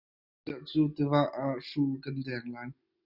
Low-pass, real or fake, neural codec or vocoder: 5.4 kHz; real; none